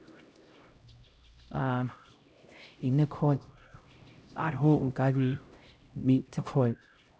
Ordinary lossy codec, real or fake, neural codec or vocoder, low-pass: none; fake; codec, 16 kHz, 0.5 kbps, X-Codec, HuBERT features, trained on LibriSpeech; none